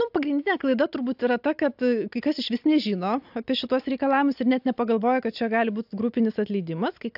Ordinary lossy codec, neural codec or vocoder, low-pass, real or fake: AAC, 48 kbps; none; 5.4 kHz; real